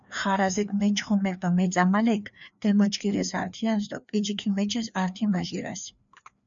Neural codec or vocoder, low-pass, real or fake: codec, 16 kHz, 2 kbps, FreqCodec, larger model; 7.2 kHz; fake